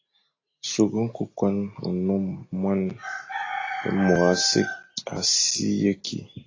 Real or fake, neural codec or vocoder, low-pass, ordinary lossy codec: real; none; 7.2 kHz; AAC, 32 kbps